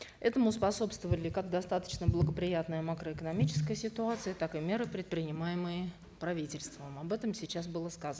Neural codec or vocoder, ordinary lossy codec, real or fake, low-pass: none; none; real; none